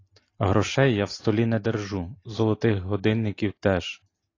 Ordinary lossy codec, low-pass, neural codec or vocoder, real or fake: AAC, 32 kbps; 7.2 kHz; none; real